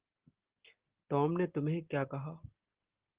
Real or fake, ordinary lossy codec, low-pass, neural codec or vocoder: real; Opus, 16 kbps; 3.6 kHz; none